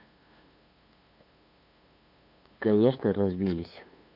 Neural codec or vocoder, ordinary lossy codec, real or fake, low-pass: codec, 16 kHz, 2 kbps, FunCodec, trained on LibriTTS, 25 frames a second; none; fake; 5.4 kHz